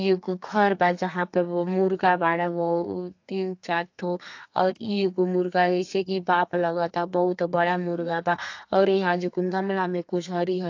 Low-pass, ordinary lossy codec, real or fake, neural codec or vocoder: 7.2 kHz; AAC, 48 kbps; fake; codec, 32 kHz, 1.9 kbps, SNAC